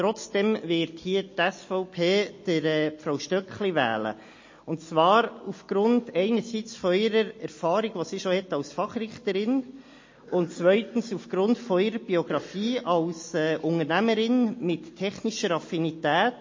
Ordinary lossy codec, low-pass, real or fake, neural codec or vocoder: MP3, 32 kbps; 7.2 kHz; real; none